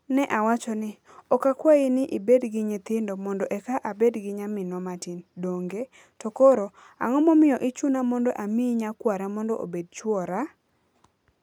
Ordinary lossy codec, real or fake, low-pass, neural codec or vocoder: none; real; 19.8 kHz; none